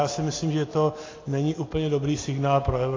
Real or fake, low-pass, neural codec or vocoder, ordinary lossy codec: real; 7.2 kHz; none; AAC, 32 kbps